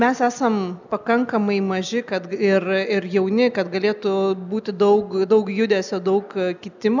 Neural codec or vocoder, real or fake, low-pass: none; real; 7.2 kHz